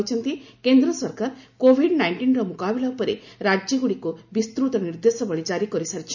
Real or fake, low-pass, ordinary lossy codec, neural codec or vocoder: real; 7.2 kHz; none; none